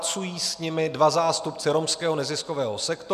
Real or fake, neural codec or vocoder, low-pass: real; none; 14.4 kHz